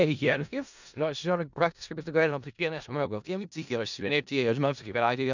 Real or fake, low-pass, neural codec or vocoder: fake; 7.2 kHz; codec, 16 kHz in and 24 kHz out, 0.4 kbps, LongCat-Audio-Codec, four codebook decoder